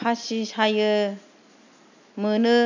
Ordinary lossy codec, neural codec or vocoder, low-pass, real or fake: none; none; 7.2 kHz; real